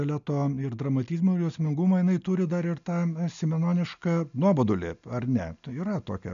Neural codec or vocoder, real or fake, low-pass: none; real; 7.2 kHz